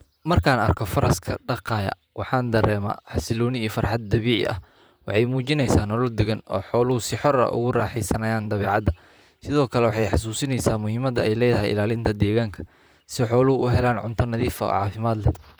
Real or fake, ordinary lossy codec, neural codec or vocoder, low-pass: fake; none; vocoder, 44.1 kHz, 128 mel bands, Pupu-Vocoder; none